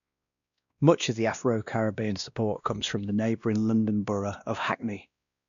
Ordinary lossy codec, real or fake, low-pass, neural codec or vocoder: none; fake; 7.2 kHz; codec, 16 kHz, 1 kbps, X-Codec, WavLM features, trained on Multilingual LibriSpeech